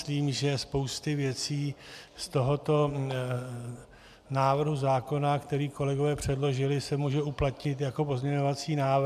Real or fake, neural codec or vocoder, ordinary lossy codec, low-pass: real; none; MP3, 96 kbps; 14.4 kHz